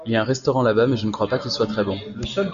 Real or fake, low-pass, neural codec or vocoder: real; 7.2 kHz; none